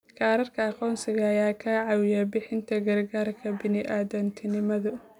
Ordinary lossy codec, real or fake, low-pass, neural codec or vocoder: none; real; 19.8 kHz; none